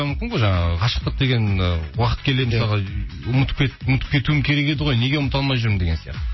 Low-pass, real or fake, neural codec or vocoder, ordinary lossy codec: 7.2 kHz; real; none; MP3, 24 kbps